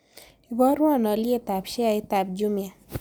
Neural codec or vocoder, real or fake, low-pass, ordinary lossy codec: none; real; none; none